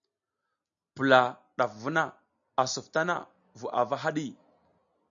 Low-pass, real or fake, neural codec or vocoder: 7.2 kHz; real; none